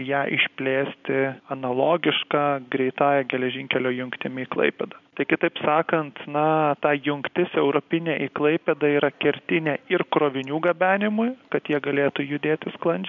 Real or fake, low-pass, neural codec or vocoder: real; 7.2 kHz; none